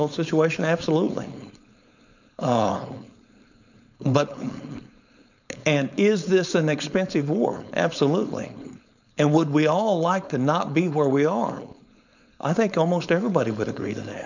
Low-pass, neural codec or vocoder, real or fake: 7.2 kHz; codec, 16 kHz, 4.8 kbps, FACodec; fake